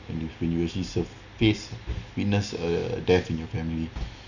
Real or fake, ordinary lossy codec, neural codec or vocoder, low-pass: real; none; none; 7.2 kHz